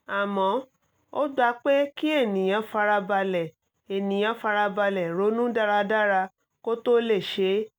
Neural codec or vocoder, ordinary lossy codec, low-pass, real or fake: none; none; none; real